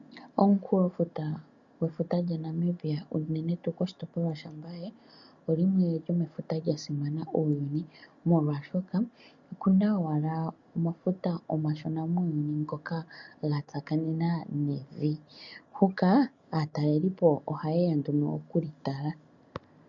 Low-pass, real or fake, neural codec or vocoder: 7.2 kHz; real; none